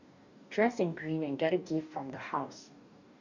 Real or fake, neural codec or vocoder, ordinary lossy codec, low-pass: fake; codec, 44.1 kHz, 2.6 kbps, DAC; none; 7.2 kHz